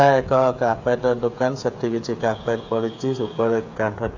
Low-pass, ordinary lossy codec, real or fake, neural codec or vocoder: 7.2 kHz; AAC, 48 kbps; fake; codec, 16 kHz, 8 kbps, FreqCodec, smaller model